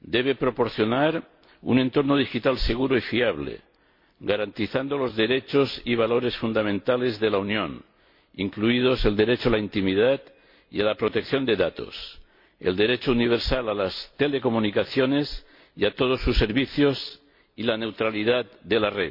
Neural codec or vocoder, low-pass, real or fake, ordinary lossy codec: none; 5.4 kHz; real; MP3, 32 kbps